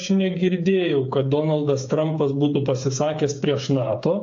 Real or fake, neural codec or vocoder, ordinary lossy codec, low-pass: fake; codec, 16 kHz, 8 kbps, FreqCodec, smaller model; AAC, 48 kbps; 7.2 kHz